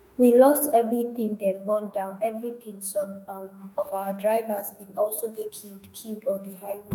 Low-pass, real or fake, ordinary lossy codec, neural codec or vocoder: none; fake; none; autoencoder, 48 kHz, 32 numbers a frame, DAC-VAE, trained on Japanese speech